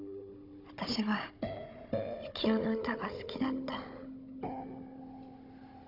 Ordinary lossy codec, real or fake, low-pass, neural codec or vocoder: none; fake; 5.4 kHz; codec, 16 kHz, 16 kbps, FunCodec, trained on Chinese and English, 50 frames a second